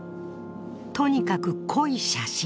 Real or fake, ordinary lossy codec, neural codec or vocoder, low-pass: real; none; none; none